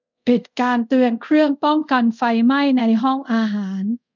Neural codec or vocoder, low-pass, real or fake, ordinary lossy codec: codec, 24 kHz, 0.5 kbps, DualCodec; 7.2 kHz; fake; none